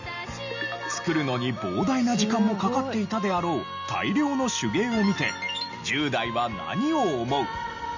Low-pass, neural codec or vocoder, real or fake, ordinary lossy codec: 7.2 kHz; none; real; none